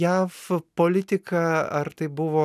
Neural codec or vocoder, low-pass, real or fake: none; 14.4 kHz; real